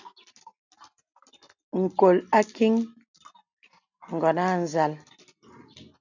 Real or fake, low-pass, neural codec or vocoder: real; 7.2 kHz; none